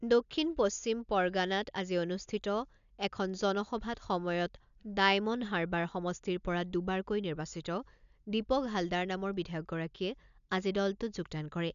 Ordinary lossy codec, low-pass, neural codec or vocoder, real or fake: none; 7.2 kHz; none; real